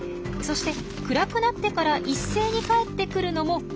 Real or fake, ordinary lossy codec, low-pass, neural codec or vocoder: real; none; none; none